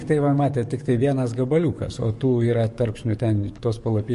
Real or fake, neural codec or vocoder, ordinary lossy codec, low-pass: fake; codec, 44.1 kHz, 7.8 kbps, DAC; MP3, 48 kbps; 14.4 kHz